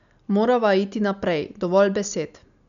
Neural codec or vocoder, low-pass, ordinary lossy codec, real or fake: none; 7.2 kHz; MP3, 96 kbps; real